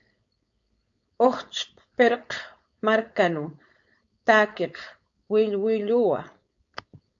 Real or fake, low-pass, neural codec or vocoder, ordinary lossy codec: fake; 7.2 kHz; codec, 16 kHz, 4.8 kbps, FACodec; AAC, 64 kbps